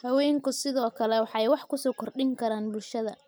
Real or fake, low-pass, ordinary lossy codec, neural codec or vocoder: real; none; none; none